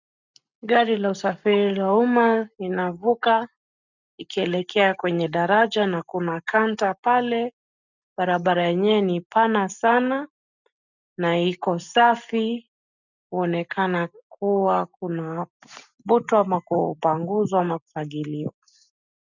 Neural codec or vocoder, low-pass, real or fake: none; 7.2 kHz; real